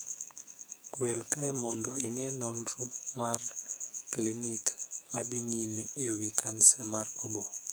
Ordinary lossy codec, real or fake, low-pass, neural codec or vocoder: none; fake; none; codec, 44.1 kHz, 2.6 kbps, SNAC